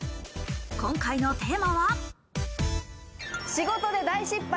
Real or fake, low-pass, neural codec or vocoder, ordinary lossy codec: real; none; none; none